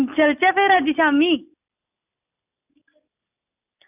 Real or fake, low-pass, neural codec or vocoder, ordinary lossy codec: real; 3.6 kHz; none; none